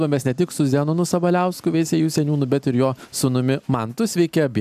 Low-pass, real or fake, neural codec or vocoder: 14.4 kHz; real; none